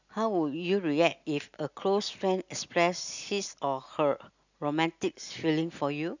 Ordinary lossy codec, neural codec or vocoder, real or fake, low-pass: none; none; real; 7.2 kHz